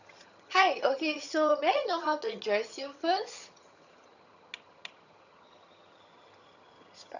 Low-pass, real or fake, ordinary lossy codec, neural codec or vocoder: 7.2 kHz; fake; none; vocoder, 22.05 kHz, 80 mel bands, HiFi-GAN